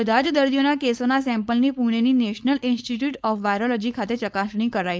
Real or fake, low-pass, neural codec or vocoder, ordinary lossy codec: fake; none; codec, 16 kHz, 4.8 kbps, FACodec; none